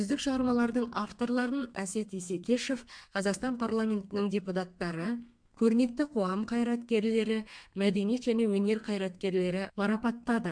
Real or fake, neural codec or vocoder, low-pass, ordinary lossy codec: fake; codec, 32 kHz, 1.9 kbps, SNAC; 9.9 kHz; MP3, 64 kbps